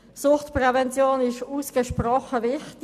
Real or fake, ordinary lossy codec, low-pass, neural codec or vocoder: real; none; 14.4 kHz; none